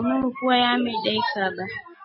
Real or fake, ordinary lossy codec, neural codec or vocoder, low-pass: real; MP3, 24 kbps; none; 7.2 kHz